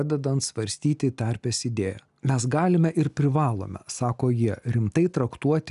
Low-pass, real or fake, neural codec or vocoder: 10.8 kHz; real; none